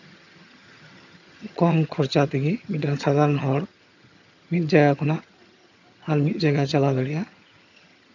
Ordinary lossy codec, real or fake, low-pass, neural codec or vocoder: none; fake; 7.2 kHz; vocoder, 22.05 kHz, 80 mel bands, HiFi-GAN